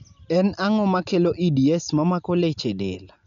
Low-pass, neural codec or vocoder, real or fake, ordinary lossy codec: 7.2 kHz; none; real; none